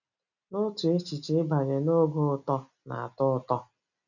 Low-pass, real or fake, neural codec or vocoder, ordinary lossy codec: 7.2 kHz; real; none; none